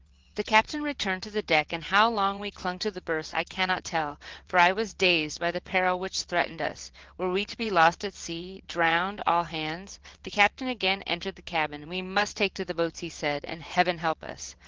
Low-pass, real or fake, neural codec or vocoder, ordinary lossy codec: 7.2 kHz; fake; vocoder, 22.05 kHz, 80 mel bands, WaveNeXt; Opus, 16 kbps